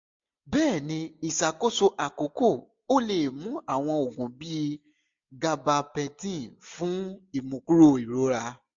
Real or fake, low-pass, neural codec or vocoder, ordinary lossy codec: real; 7.2 kHz; none; MP3, 48 kbps